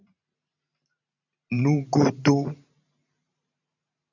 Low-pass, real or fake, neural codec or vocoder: 7.2 kHz; fake; vocoder, 44.1 kHz, 128 mel bands every 512 samples, BigVGAN v2